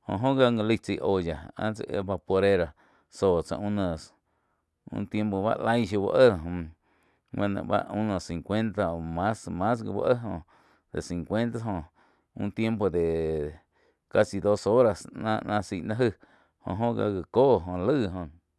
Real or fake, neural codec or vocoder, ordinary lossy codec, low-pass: real; none; none; none